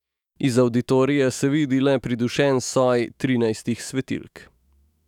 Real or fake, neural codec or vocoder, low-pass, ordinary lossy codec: fake; autoencoder, 48 kHz, 128 numbers a frame, DAC-VAE, trained on Japanese speech; 19.8 kHz; none